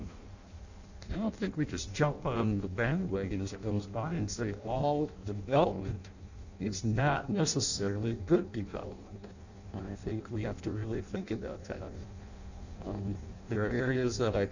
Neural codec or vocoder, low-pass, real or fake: codec, 16 kHz in and 24 kHz out, 0.6 kbps, FireRedTTS-2 codec; 7.2 kHz; fake